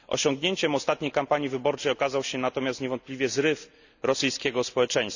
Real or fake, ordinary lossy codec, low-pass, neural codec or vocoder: real; none; 7.2 kHz; none